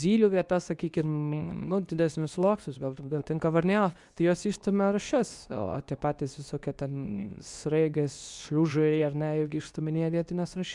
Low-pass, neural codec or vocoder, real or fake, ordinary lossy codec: 10.8 kHz; codec, 24 kHz, 0.9 kbps, WavTokenizer, medium speech release version 2; fake; Opus, 64 kbps